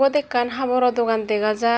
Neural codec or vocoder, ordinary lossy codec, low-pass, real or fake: none; none; none; real